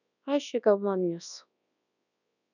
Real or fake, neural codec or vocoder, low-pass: fake; codec, 24 kHz, 0.9 kbps, WavTokenizer, large speech release; 7.2 kHz